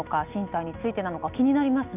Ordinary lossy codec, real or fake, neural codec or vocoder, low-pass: none; real; none; 3.6 kHz